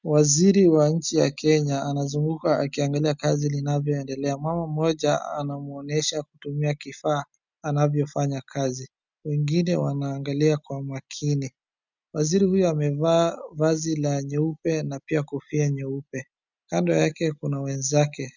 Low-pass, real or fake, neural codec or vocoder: 7.2 kHz; real; none